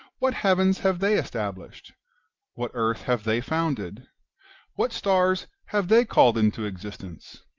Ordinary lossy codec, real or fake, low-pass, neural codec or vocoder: Opus, 24 kbps; real; 7.2 kHz; none